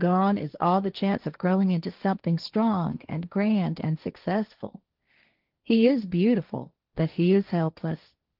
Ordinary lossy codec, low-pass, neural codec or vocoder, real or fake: Opus, 24 kbps; 5.4 kHz; codec, 16 kHz, 1.1 kbps, Voila-Tokenizer; fake